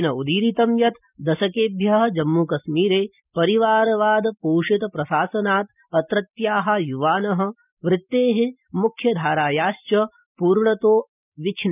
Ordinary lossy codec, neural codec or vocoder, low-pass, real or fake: none; none; 3.6 kHz; real